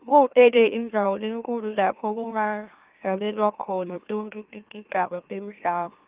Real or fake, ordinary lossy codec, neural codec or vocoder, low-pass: fake; Opus, 24 kbps; autoencoder, 44.1 kHz, a latent of 192 numbers a frame, MeloTTS; 3.6 kHz